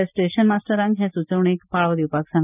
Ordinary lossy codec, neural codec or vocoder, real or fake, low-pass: none; none; real; 3.6 kHz